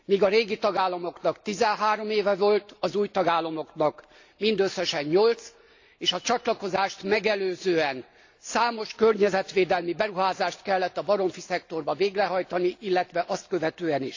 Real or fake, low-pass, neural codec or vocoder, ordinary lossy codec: real; 7.2 kHz; none; AAC, 48 kbps